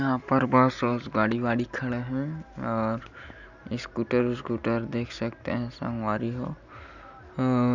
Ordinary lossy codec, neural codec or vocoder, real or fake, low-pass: none; none; real; 7.2 kHz